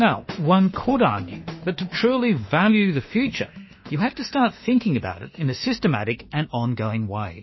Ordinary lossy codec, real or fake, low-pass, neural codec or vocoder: MP3, 24 kbps; fake; 7.2 kHz; autoencoder, 48 kHz, 32 numbers a frame, DAC-VAE, trained on Japanese speech